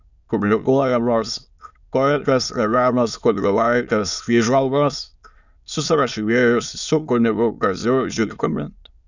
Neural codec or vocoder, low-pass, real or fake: autoencoder, 22.05 kHz, a latent of 192 numbers a frame, VITS, trained on many speakers; 7.2 kHz; fake